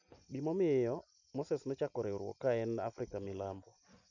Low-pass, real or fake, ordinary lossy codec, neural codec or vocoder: 7.2 kHz; real; none; none